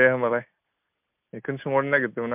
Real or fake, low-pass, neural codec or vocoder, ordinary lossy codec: real; 3.6 kHz; none; MP3, 32 kbps